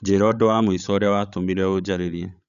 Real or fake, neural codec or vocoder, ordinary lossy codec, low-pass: fake; codec, 16 kHz, 16 kbps, FreqCodec, larger model; none; 7.2 kHz